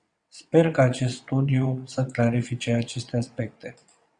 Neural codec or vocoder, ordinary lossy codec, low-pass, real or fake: vocoder, 22.05 kHz, 80 mel bands, WaveNeXt; Opus, 64 kbps; 9.9 kHz; fake